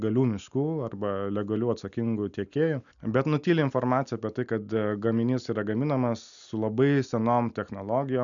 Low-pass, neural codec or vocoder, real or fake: 7.2 kHz; none; real